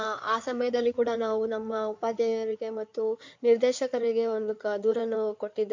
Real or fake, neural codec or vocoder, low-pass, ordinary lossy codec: fake; codec, 16 kHz in and 24 kHz out, 2.2 kbps, FireRedTTS-2 codec; 7.2 kHz; MP3, 48 kbps